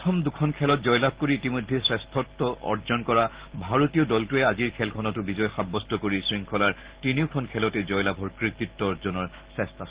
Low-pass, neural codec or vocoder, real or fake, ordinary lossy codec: 3.6 kHz; none; real; Opus, 16 kbps